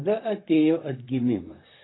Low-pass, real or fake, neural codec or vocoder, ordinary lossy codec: 7.2 kHz; fake; vocoder, 44.1 kHz, 128 mel bands, Pupu-Vocoder; AAC, 16 kbps